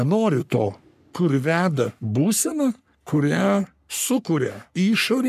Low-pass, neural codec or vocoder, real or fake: 14.4 kHz; codec, 44.1 kHz, 3.4 kbps, Pupu-Codec; fake